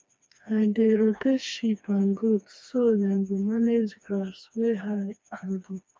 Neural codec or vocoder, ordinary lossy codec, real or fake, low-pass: codec, 16 kHz, 2 kbps, FreqCodec, smaller model; none; fake; none